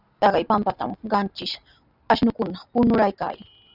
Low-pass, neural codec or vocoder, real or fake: 5.4 kHz; none; real